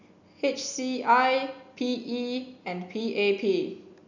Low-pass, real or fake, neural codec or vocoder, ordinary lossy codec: 7.2 kHz; real; none; none